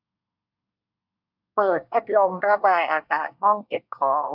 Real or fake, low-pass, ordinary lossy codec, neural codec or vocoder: fake; 5.4 kHz; AAC, 48 kbps; codec, 24 kHz, 1 kbps, SNAC